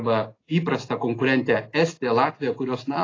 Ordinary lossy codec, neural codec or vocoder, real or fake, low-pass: AAC, 32 kbps; none; real; 7.2 kHz